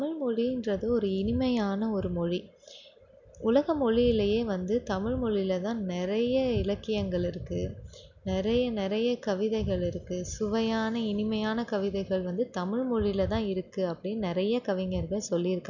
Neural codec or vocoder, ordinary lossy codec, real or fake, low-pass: none; none; real; 7.2 kHz